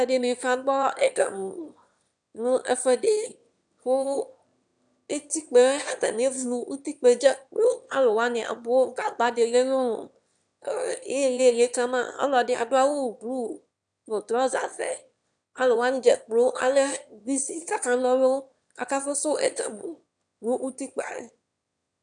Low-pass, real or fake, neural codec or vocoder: 9.9 kHz; fake; autoencoder, 22.05 kHz, a latent of 192 numbers a frame, VITS, trained on one speaker